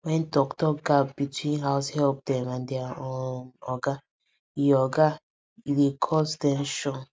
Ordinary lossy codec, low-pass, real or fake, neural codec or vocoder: none; none; real; none